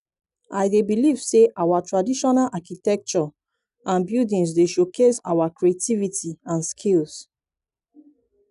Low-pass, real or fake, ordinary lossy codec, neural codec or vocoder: 10.8 kHz; real; none; none